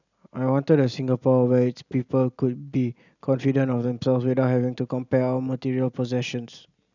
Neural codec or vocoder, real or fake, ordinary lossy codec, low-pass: none; real; none; 7.2 kHz